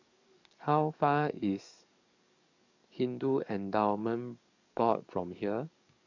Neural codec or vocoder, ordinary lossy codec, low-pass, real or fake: codec, 44.1 kHz, 7.8 kbps, DAC; none; 7.2 kHz; fake